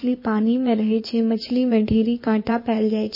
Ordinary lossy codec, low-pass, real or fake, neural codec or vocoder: MP3, 24 kbps; 5.4 kHz; fake; codec, 16 kHz in and 24 kHz out, 2.2 kbps, FireRedTTS-2 codec